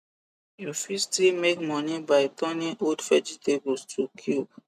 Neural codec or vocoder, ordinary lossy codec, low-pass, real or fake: none; none; 14.4 kHz; real